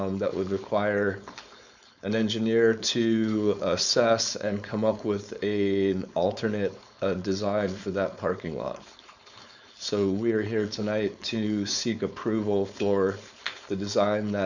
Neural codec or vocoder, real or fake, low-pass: codec, 16 kHz, 4.8 kbps, FACodec; fake; 7.2 kHz